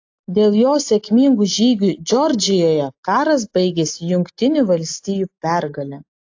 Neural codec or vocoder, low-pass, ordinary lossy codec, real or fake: none; 7.2 kHz; AAC, 48 kbps; real